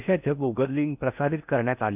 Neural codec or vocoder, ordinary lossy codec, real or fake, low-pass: codec, 16 kHz in and 24 kHz out, 0.6 kbps, FocalCodec, streaming, 4096 codes; none; fake; 3.6 kHz